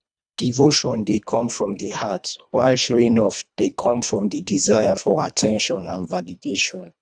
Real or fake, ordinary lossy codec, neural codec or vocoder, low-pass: fake; none; codec, 24 kHz, 1.5 kbps, HILCodec; 9.9 kHz